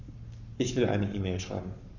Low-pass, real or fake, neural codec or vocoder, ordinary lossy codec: 7.2 kHz; fake; codec, 44.1 kHz, 7.8 kbps, Pupu-Codec; none